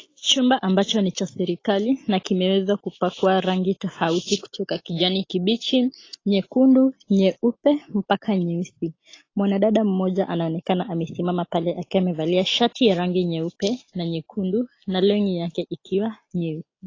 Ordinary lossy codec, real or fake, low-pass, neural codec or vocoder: AAC, 32 kbps; real; 7.2 kHz; none